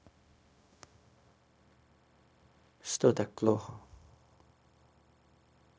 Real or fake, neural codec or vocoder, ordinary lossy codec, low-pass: fake; codec, 16 kHz, 0.4 kbps, LongCat-Audio-Codec; none; none